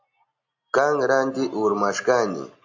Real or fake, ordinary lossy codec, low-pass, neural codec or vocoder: real; AAC, 48 kbps; 7.2 kHz; none